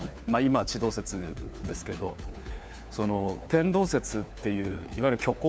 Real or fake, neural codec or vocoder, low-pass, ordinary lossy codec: fake; codec, 16 kHz, 4 kbps, FunCodec, trained on LibriTTS, 50 frames a second; none; none